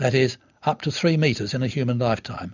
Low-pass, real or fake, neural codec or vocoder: 7.2 kHz; real; none